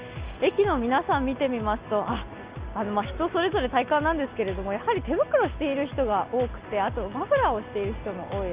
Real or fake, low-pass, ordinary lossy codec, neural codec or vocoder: real; 3.6 kHz; Opus, 24 kbps; none